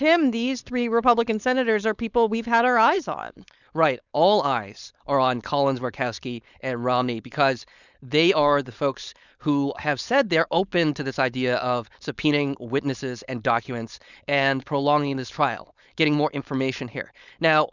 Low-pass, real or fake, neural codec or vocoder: 7.2 kHz; fake; codec, 16 kHz, 4.8 kbps, FACodec